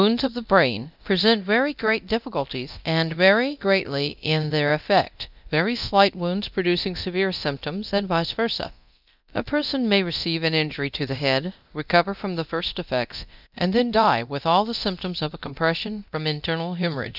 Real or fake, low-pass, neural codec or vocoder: fake; 5.4 kHz; codec, 24 kHz, 0.9 kbps, DualCodec